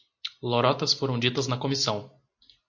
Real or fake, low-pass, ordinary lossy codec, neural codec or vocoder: real; 7.2 kHz; MP3, 48 kbps; none